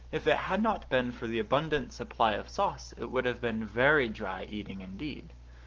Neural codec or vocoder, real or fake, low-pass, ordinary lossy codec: codec, 44.1 kHz, 7.8 kbps, Pupu-Codec; fake; 7.2 kHz; Opus, 24 kbps